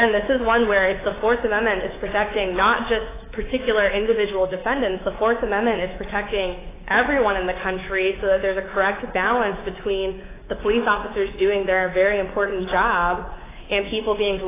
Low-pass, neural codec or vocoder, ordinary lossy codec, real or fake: 3.6 kHz; codec, 24 kHz, 3.1 kbps, DualCodec; AAC, 16 kbps; fake